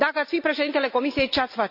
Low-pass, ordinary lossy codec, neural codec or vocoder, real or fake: 5.4 kHz; none; none; real